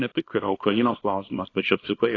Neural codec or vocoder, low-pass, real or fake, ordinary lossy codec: codec, 24 kHz, 0.9 kbps, WavTokenizer, small release; 7.2 kHz; fake; AAC, 32 kbps